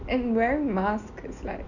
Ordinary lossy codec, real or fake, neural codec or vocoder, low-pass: none; real; none; 7.2 kHz